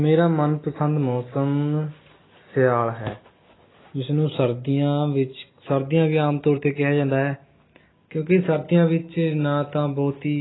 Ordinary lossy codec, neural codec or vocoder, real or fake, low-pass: AAC, 16 kbps; none; real; 7.2 kHz